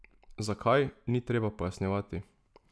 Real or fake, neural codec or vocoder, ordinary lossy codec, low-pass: real; none; none; none